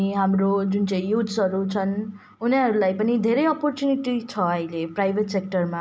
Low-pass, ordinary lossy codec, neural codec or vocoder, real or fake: none; none; none; real